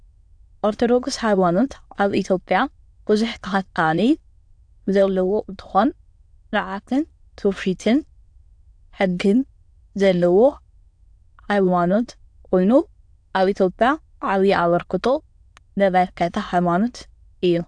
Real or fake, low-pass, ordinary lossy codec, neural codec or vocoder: fake; 9.9 kHz; AAC, 64 kbps; autoencoder, 22.05 kHz, a latent of 192 numbers a frame, VITS, trained on many speakers